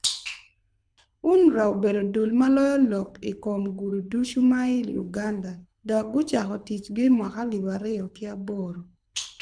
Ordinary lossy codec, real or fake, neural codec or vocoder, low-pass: MP3, 96 kbps; fake; codec, 24 kHz, 6 kbps, HILCodec; 9.9 kHz